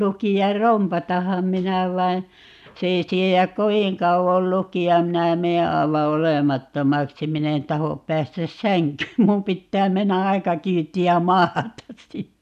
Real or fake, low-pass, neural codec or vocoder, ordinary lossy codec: real; 14.4 kHz; none; none